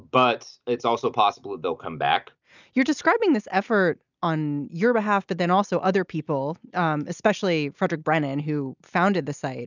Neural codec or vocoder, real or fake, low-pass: none; real; 7.2 kHz